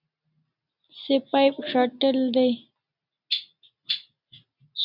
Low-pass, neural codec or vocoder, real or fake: 5.4 kHz; none; real